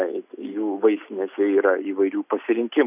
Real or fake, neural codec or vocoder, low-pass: real; none; 3.6 kHz